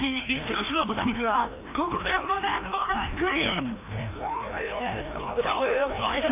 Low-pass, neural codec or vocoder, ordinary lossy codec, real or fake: 3.6 kHz; codec, 16 kHz, 1 kbps, FreqCodec, larger model; AAC, 24 kbps; fake